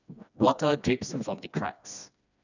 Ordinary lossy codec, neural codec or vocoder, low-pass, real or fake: none; codec, 16 kHz, 2 kbps, FreqCodec, smaller model; 7.2 kHz; fake